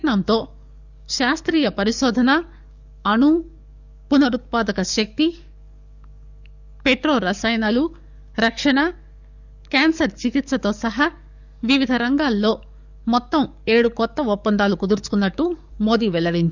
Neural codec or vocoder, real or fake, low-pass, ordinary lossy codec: codec, 44.1 kHz, 7.8 kbps, DAC; fake; 7.2 kHz; none